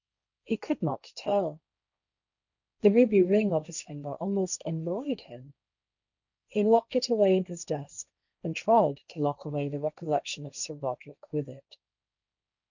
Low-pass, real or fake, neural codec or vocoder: 7.2 kHz; fake; codec, 16 kHz, 1.1 kbps, Voila-Tokenizer